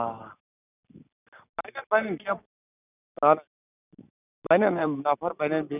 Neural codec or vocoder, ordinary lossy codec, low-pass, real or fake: none; none; 3.6 kHz; real